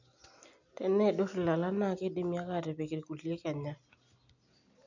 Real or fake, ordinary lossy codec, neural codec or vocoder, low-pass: real; none; none; 7.2 kHz